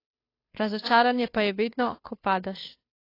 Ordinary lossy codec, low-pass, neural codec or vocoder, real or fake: AAC, 24 kbps; 5.4 kHz; codec, 16 kHz, 2 kbps, FunCodec, trained on Chinese and English, 25 frames a second; fake